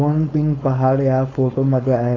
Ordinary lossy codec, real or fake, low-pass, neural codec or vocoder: AAC, 32 kbps; fake; 7.2 kHz; codec, 16 kHz, 4.8 kbps, FACodec